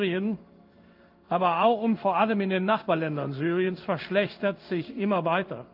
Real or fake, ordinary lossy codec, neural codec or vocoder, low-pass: fake; Opus, 24 kbps; codec, 16 kHz in and 24 kHz out, 1 kbps, XY-Tokenizer; 5.4 kHz